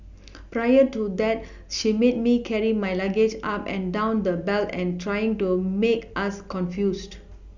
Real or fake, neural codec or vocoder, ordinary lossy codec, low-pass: real; none; none; 7.2 kHz